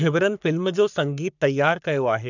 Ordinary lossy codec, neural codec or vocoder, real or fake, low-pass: none; codec, 44.1 kHz, 3.4 kbps, Pupu-Codec; fake; 7.2 kHz